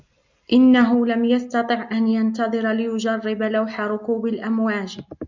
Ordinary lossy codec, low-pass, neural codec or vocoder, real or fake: MP3, 64 kbps; 7.2 kHz; none; real